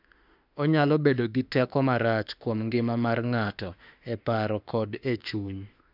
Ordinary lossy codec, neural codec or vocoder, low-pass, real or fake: none; autoencoder, 48 kHz, 32 numbers a frame, DAC-VAE, trained on Japanese speech; 5.4 kHz; fake